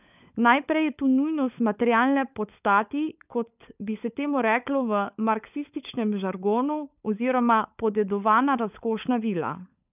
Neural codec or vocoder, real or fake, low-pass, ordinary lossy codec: codec, 16 kHz, 16 kbps, FunCodec, trained on LibriTTS, 50 frames a second; fake; 3.6 kHz; none